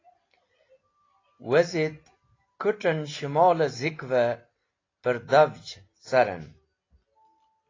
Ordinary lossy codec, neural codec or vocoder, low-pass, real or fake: AAC, 32 kbps; none; 7.2 kHz; real